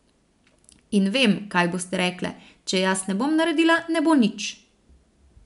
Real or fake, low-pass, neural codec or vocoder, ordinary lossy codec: real; 10.8 kHz; none; none